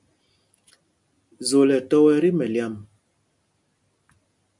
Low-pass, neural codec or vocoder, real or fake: 10.8 kHz; none; real